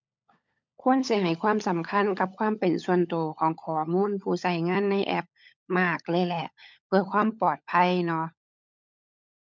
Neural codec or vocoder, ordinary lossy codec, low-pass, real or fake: codec, 16 kHz, 16 kbps, FunCodec, trained on LibriTTS, 50 frames a second; MP3, 64 kbps; 7.2 kHz; fake